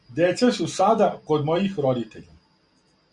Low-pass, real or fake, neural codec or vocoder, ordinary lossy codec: 10.8 kHz; real; none; Opus, 64 kbps